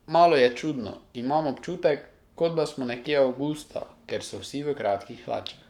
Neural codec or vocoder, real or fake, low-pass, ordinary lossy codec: codec, 44.1 kHz, 7.8 kbps, Pupu-Codec; fake; 19.8 kHz; none